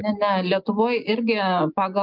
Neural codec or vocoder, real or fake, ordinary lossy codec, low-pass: none; real; Opus, 24 kbps; 5.4 kHz